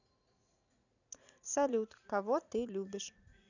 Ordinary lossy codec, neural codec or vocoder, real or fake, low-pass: none; vocoder, 44.1 kHz, 80 mel bands, Vocos; fake; 7.2 kHz